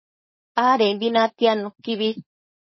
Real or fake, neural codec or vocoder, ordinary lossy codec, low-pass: fake; codec, 16 kHz, 4.8 kbps, FACodec; MP3, 24 kbps; 7.2 kHz